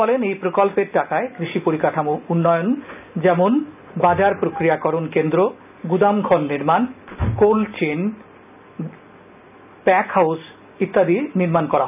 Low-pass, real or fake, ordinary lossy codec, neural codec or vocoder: 3.6 kHz; real; none; none